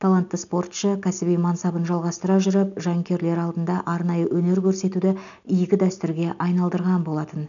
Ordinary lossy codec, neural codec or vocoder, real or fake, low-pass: none; none; real; 7.2 kHz